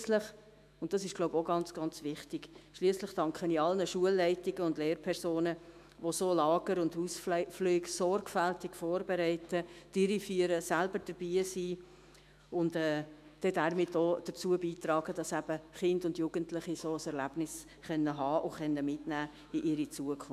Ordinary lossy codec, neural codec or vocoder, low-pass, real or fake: MP3, 96 kbps; autoencoder, 48 kHz, 128 numbers a frame, DAC-VAE, trained on Japanese speech; 14.4 kHz; fake